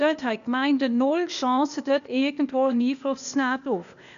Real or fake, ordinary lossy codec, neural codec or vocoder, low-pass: fake; none; codec, 16 kHz, 0.8 kbps, ZipCodec; 7.2 kHz